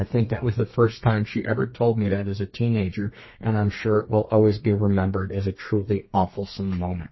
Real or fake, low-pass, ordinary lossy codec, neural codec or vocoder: fake; 7.2 kHz; MP3, 24 kbps; codec, 32 kHz, 1.9 kbps, SNAC